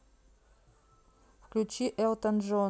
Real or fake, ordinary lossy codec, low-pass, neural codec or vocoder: real; none; none; none